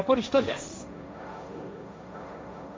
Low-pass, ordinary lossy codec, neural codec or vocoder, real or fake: none; none; codec, 16 kHz, 1.1 kbps, Voila-Tokenizer; fake